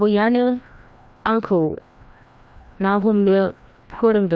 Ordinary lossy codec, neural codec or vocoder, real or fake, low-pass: none; codec, 16 kHz, 1 kbps, FreqCodec, larger model; fake; none